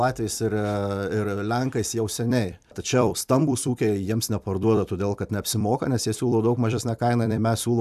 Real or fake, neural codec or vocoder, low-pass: fake; vocoder, 44.1 kHz, 128 mel bands every 256 samples, BigVGAN v2; 14.4 kHz